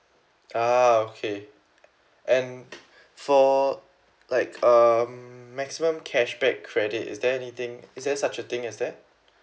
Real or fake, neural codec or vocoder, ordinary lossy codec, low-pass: real; none; none; none